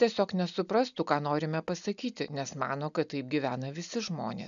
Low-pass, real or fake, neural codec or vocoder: 7.2 kHz; real; none